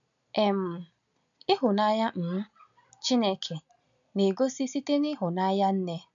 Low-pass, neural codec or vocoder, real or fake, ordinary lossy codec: 7.2 kHz; none; real; none